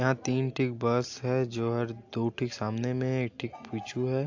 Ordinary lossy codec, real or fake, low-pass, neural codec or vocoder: AAC, 48 kbps; real; 7.2 kHz; none